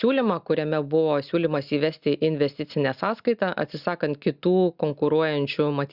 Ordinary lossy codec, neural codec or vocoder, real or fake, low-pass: Opus, 64 kbps; none; real; 5.4 kHz